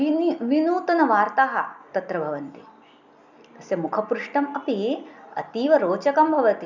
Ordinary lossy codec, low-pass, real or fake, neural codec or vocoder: none; 7.2 kHz; real; none